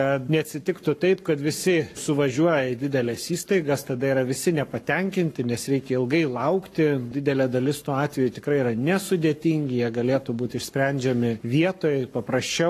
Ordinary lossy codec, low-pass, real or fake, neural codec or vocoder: AAC, 48 kbps; 14.4 kHz; fake; codec, 44.1 kHz, 7.8 kbps, Pupu-Codec